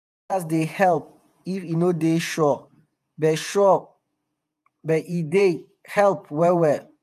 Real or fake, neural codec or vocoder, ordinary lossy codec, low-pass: fake; vocoder, 48 kHz, 128 mel bands, Vocos; none; 14.4 kHz